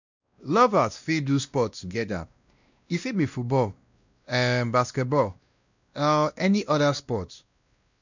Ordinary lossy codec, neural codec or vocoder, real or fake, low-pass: none; codec, 16 kHz, 1 kbps, X-Codec, WavLM features, trained on Multilingual LibriSpeech; fake; 7.2 kHz